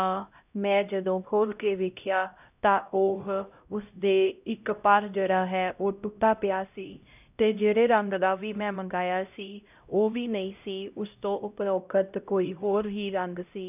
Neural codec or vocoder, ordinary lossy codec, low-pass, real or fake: codec, 16 kHz, 0.5 kbps, X-Codec, HuBERT features, trained on LibriSpeech; none; 3.6 kHz; fake